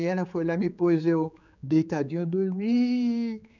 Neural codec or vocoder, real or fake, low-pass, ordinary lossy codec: codec, 16 kHz, 4 kbps, X-Codec, HuBERT features, trained on general audio; fake; 7.2 kHz; none